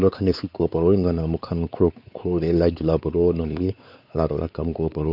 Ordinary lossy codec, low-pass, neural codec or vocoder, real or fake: none; 5.4 kHz; codec, 16 kHz, 4 kbps, X-Codec, WavLM features, trained on Multilingual LibriSpeech; fake